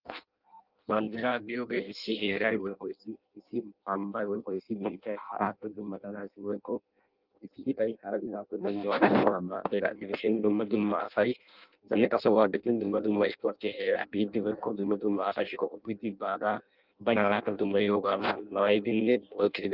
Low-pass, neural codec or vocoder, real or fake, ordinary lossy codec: 5.4 kHz; codec, 16 kHz in and 24 kHz out, 0.6 kbps, FireRedTTS-2 codec; fake; Opus, 24 kbps